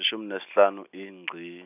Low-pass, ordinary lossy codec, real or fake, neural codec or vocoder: 3.6 kHz; none; real; none